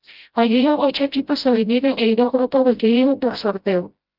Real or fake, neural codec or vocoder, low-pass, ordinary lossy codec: fake; codec, 16 kHz, 0.5 kbps, FreqCodec, smaller model; 5.4 kHz; Opus, 32 kbps